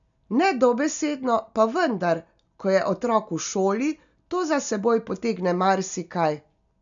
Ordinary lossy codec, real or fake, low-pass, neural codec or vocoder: none; real; 7.2 kHz; none